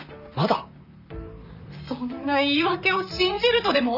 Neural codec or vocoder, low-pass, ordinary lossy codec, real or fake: vocoder, 44.1 kHz, 128 mel bands, Pupu-Vocoder; 5.4 kHz; none; fake